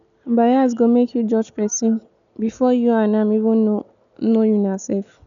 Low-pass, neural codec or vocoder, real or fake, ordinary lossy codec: 7.2 kHz; none; real; none